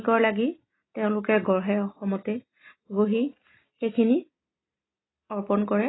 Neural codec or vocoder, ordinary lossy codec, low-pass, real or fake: none; AAC, 16 kbps; 7.2 kHz; real